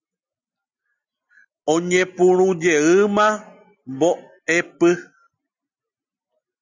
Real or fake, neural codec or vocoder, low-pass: real; none; 7.2 kHz